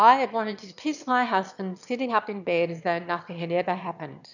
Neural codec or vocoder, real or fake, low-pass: autoencoder, 22.05 kHz, a latent of 192 numbers a frame, VITS, trained on one speaker; fake; 7.2 kHz